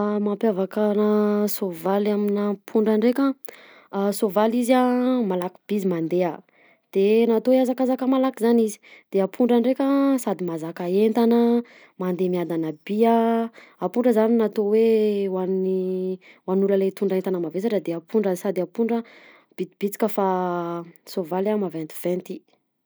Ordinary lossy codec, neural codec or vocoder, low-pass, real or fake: none; none; none; real